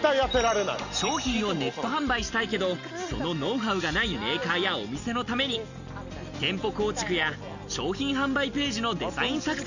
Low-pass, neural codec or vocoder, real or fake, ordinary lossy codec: 7.2 kHz; none; real; none